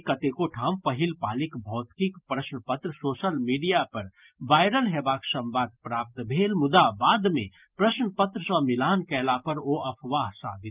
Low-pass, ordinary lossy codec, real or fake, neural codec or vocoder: 3.6 kHz; Opus, 24 kbps; real; none